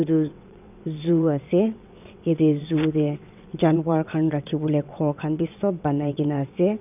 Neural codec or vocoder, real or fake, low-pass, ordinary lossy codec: vocoder, 22.05 kHz, 80 mel bands, WaveNeXt; fake; 3.6 kHz; none